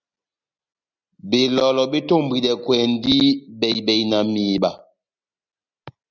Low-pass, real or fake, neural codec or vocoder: 7.2 kHz; real; none